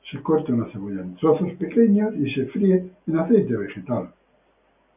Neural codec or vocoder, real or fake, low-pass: none; real; 3.6 kHz